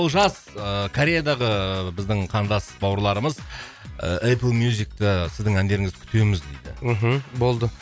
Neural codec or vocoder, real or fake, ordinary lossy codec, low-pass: none; real; none; none